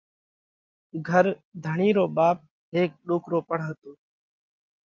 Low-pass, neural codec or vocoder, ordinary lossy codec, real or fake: 7.2 kHz; none; Opus, 24 kbps; real